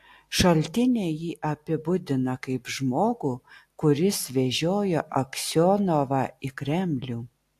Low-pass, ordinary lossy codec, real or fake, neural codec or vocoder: 14.4 kHz; AAC, 64 kbps; real; none